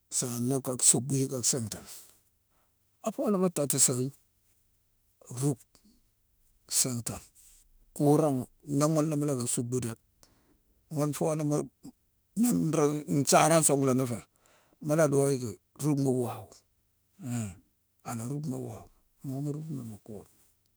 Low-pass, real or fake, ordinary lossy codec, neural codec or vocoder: none; fake; none; autoencoder, 48 kHz, 32 numbers a frame, DAC-VAE, trained on Japanese speech